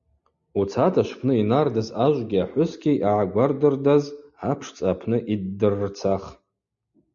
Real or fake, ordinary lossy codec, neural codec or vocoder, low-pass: real; MP3, 48 kbps; none; 7.2 kHz